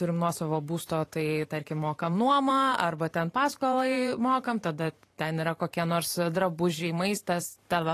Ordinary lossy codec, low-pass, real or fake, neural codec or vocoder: AAC, 48 kbps; 14.4 kHz; fake; vocoder, 44.1 kHz, 128 mel bands every 512 samples, BigVGAN v2